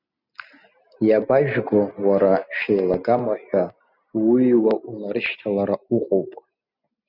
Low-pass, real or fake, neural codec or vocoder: 5.4 kHz; real; none